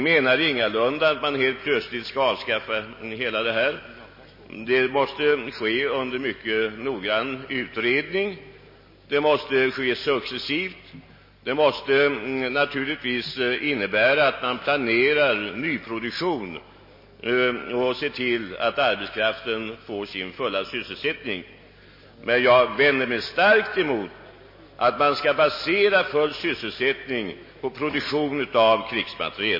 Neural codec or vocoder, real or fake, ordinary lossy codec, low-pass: none; real; MP3, 24 kbps; 5.4 kHz